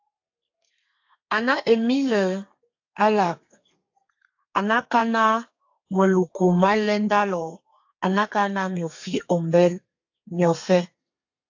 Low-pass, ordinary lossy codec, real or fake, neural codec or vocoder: 7.2 kHz; AAC, 48 kbps; fake; codec, 32 kHz, 1.9 kbps, SNAC